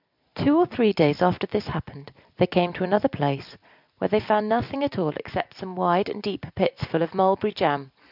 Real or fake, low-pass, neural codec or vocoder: real; 5.4 kHz; none